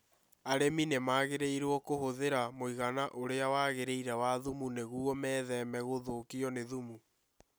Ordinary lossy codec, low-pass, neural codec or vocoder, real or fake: none; none; none; real